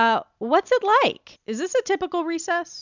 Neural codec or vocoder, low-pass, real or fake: none; 7.2 kHz; real